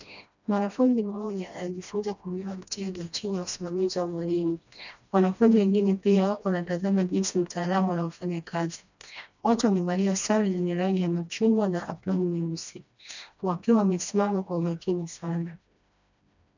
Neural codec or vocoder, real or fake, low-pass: codec, 16 kHz, 1 kbps, FreqCodec, smaller model; fake; 7.2 kHz